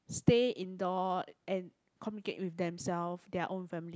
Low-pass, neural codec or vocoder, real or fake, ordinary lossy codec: none; none; real; none